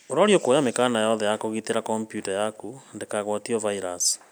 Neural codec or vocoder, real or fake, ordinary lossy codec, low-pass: none; real; none; none